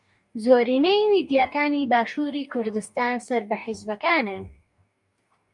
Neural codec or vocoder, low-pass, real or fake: codec, 44.1 kHz, 2.6 kbps, DAC; 10.8 kHz; fake